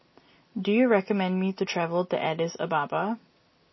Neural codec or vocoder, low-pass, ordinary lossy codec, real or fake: none; 7.2 kHz; MP3, 24 kbps; real